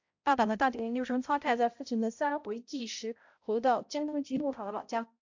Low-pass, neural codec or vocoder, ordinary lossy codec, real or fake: 7.2 kHz; codec, 16 kHz, 0.5 kbps, X-Codec, HuBERT features, trained on balanced general audio; AAC, 48 kbps; fake